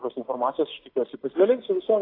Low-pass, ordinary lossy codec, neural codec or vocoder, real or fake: 5.4 kHz; AAC, 24 kbps; none; real